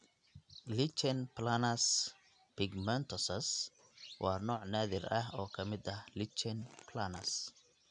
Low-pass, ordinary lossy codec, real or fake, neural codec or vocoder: 9.9 kHz; none; real; none